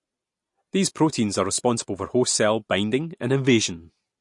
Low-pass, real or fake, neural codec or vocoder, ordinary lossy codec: 10.8 kHz; real; none; MP3, 48 kbps